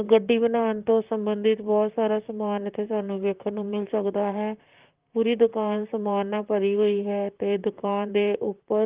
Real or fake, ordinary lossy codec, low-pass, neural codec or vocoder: fake; Opus, 32 kbps; 3.6 kHz; vocoder, 44.1 kHz, 128 mel bands, Pupu-Vocoder